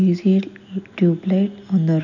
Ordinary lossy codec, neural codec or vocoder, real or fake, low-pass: none; none; real; 7.2 kHz